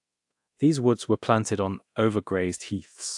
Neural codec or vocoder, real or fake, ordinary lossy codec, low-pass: codec, 24 kHz, 0.9 kbps, DualCodec; fake; AAC, 64 kbps; 10.8 kHz